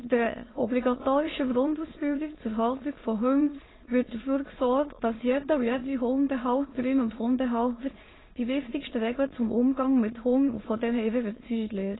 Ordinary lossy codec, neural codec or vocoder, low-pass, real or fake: AAC, 16 kbps; autoencoder, 22.05 kHz, a latent of 192 numbers a frame, VITS, trained on many speakers; 7.2 kHz; fake